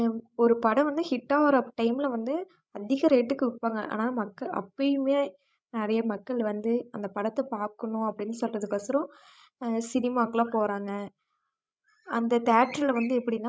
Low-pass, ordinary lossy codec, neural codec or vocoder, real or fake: none; none; codec, 16 kHz, 16 kbps, FreqCodec, larger model; fake